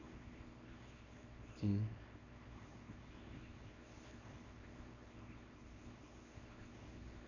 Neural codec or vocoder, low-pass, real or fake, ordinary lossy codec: codec, 24 kHz, 0.9 kbps, WavTokenizer, small release; 7.2 kHz; fake; none